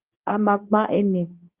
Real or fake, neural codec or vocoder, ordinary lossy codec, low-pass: fake; codec, 24 kHz, 0.9 kbps, WavTokenizer, medium speech release version 2; Opus, 32 kbps; 3.6 kHz